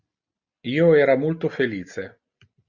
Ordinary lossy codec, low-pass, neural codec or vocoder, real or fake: Opus, 64 kbps; 7.2 kHz; none; real